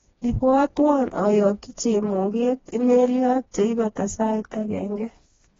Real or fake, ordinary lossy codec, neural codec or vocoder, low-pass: fake; AAC, 24 kbps; codec, 16 kHz, 2 kbps, FreqCodec, smaller model; 7.2 kHz